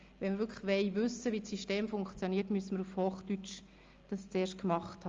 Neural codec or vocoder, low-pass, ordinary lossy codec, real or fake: none; 7.2 kHz; Opus, 32 kbps; real